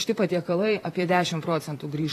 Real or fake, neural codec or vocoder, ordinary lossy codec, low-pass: fake; vocoder, 44.1 kHz, 128 mel bands, Pupu-Vocoder; AAC, 48 kbps; 14.4 kHz